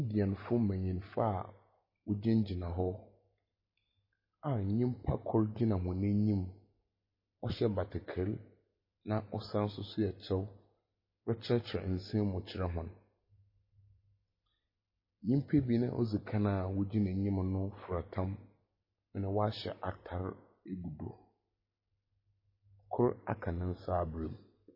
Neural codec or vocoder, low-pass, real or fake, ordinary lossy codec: none; 5.4 kHz; real; MP3, 24 kbps